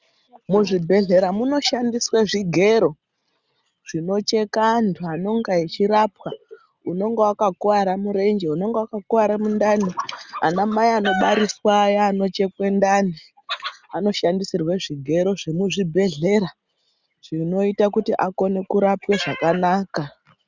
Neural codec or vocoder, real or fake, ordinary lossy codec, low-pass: none; real; Opus, 64 kbps; 7.2 kHz